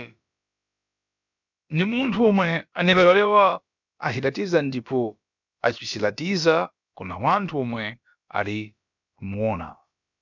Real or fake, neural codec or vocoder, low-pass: fake; codec, 16 kHz, about 1 kbps, DyCAST, with the encoder's durations; 7.2 kHz